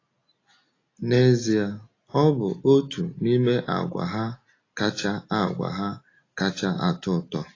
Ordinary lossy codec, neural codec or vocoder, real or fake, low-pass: AAC, 32 kbps; none; real; 7.2 kHz